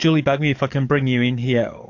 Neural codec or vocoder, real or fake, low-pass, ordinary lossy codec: codec, 44.1 kHz, 7.8 kbps, DAC; fake; 7.2 kHz; AAC, 48 kbps